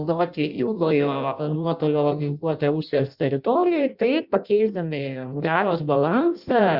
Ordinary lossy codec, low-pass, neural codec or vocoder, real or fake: Opus, 64 kbps; 5.4 kHz; codec, 16 kHz in and 24 kHz out, 0.6 kbps, FireRedTTS-2 codec; fake